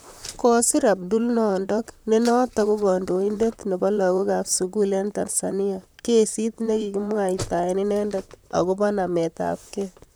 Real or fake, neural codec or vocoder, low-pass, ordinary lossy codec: fake; vocoder, 44.1 kHz, 128 mel bands, Pupu-Vocoder; none; none